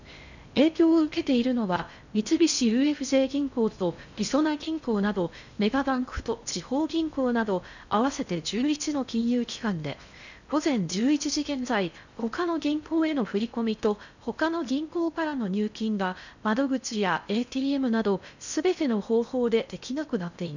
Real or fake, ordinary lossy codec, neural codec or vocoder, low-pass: fake; none; codec, 16 kHz in and 24 kHz out, 0.6 kbps, FocalCodec, streaming, 4096 codes; 7.2 kHz